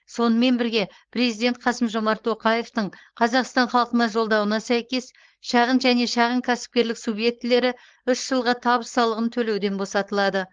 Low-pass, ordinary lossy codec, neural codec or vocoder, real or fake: 7.2 kHz; Opus, 16 kbps; codec, 16 kHz, 4.8 kbps, FACodec; fake